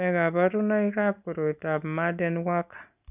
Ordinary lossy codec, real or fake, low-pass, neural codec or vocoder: none; real; 3.6 kHz; none